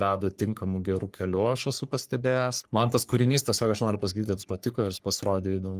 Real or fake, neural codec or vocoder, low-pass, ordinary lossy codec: fake; codec, 44.1 kHz, 3.4 kbps, Pupu-Codec; 14.4 kHz; Opus, 32 kbps